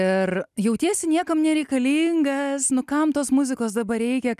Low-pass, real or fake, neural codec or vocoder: 14.4 kHz; real; none